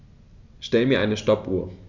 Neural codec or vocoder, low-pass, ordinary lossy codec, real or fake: none; 7.2 kHz; none; real